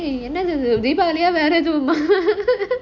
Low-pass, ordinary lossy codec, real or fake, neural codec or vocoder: 7.2 kHz; none; real; none